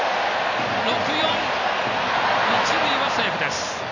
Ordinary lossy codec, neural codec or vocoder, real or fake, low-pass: none; none; real; 7.2 kHz